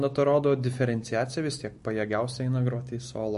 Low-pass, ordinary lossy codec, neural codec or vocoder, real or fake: 10.8 kHz; MP3, 48 kbps; none; real